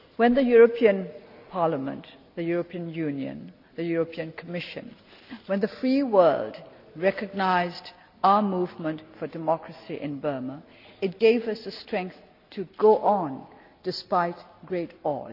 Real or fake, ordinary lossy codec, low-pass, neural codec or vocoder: real; none; 5.4 kHz; none